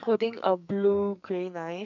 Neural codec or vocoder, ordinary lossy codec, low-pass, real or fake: codec, 44.1 kHz, 2.6 kbps, SNAC; none; 7.2 kHz; fake